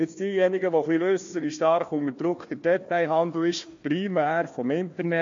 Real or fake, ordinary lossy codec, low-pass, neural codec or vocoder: fake; MP3, 48 kbps; 7.2 kHz; codec, 16 kHz, 1 kbps, FunCodec, trained on Chinese and English, 50 frames a second